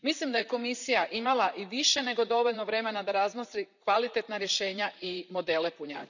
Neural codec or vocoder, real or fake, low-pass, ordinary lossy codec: vocoder, 44.1 kHz, 128 mel bands, Pupu-Vocoder; fake; 7.2 kHz; none